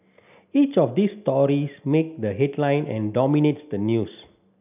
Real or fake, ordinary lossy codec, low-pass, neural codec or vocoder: real; none; 3.6 kHz; none